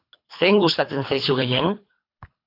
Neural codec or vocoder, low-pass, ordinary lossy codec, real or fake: codec, 24 kHz, 3 kbps, HILCodec; 5.4 kHz; AAC, 32 kbps; fake